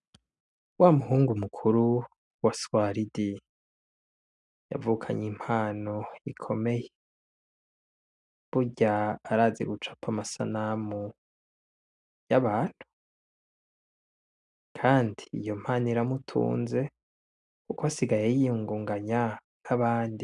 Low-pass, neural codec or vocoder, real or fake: 10.8 kHz; none; real